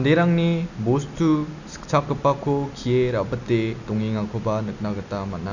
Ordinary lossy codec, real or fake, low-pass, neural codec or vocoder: none; real; 7.2 kHz; none